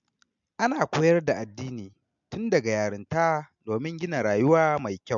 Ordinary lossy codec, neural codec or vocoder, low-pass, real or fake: MP3, 64 kbps; none; 7.2 kHz; real